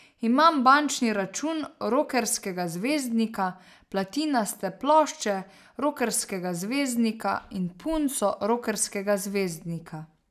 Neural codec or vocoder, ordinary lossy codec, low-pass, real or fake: none; none; 14.4 kHz; real